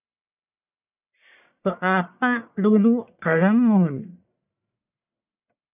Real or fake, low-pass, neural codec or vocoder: fake; 3.6 kHz; codec, 44.1 kHz, 1.7 kbps, Pupu-Codec